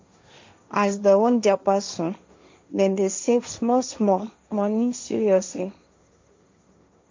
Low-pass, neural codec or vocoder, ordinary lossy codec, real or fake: 7.2 kHz; codec, 16 kHz, 1.1 kbps, Voila-Tokenizer; MP3, 48 kbps; fake